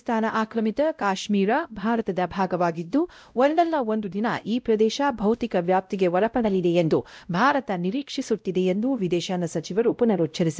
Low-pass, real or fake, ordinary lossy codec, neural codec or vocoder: none; fake; none; codec, 16 kHz, 0.5 kbps, X-Codec, WavLM features, trained on Multilingual LibriSpeech